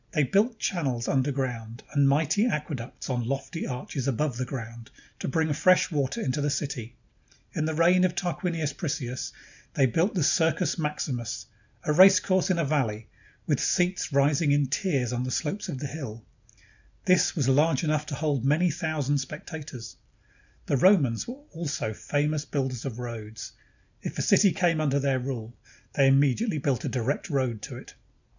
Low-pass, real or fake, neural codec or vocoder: 7.2 kHz; real; none